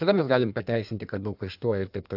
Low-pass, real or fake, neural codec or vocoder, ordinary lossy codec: 5.4 kHz; fake; codec, 16 kHz in and 24 kHz out, 1.1 kbps, FireRedTTS-2 codec; AAC, 48 kbps